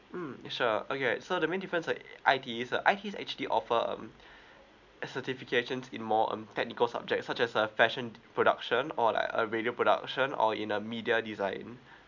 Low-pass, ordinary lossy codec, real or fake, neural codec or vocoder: 7.2 kHz; none; real; none